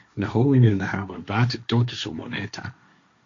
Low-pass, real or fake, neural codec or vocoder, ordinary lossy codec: 7.2 kHz; fake; codec, 16 kHz, 1.1 kbps, Voila-Tokenizer; AAC, 48 kbps